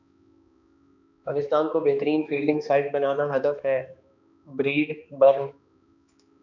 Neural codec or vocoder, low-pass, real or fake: codec, 16 kHz, 2 kbps, X-Codec, HuBERT features, trained on balanced general audio; 7.2 kHz; fake